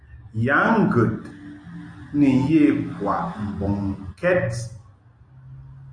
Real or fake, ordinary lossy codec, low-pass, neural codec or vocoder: real; AAC, 48 kbps; 9.9 kHz; none